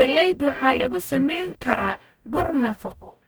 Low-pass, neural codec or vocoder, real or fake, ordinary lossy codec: none; codec, 44.1 kHz, 0.9 kbps, DAC; fake; none